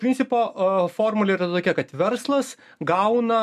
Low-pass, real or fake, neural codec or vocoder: 14.4 kHz; real; none